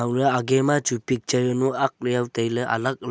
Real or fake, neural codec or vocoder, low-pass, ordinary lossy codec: real; none; none; none